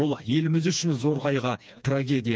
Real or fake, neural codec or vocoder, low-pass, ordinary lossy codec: fake; codec, 16 kHz, 2 kbps, FreqCodec, smaller model; none; none